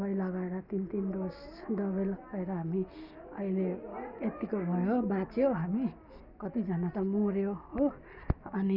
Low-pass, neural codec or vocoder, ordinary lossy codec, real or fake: 5.4 kHz; none; none; real